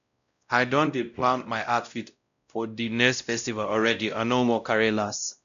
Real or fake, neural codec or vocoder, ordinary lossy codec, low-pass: fake; codec, 16 kHz, 0.5 kbps, X-Codec, WavLM features, trained on Multilingual LibriSpeech; none; 7.2 kHz